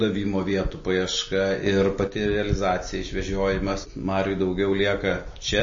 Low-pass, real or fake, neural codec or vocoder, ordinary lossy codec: 7.2 kHz; real; none; MP3, 32 kbps